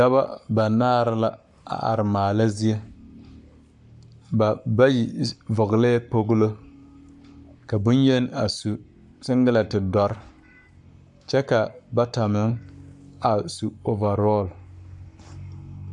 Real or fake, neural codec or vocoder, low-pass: fake; codec, 44.1 kHz, 7.8 kbps, Pupu-Codec; 10.8 kHz